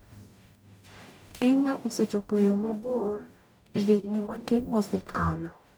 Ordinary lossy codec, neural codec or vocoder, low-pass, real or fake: none; codec, 44.1 kHz, 0.9 kbps, DAC; none; fake